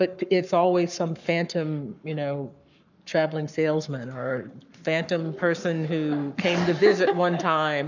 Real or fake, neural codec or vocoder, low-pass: fake; codec, 44.1 kHz, 7.8 kbps, Pupu-Codec; 7.2 kHz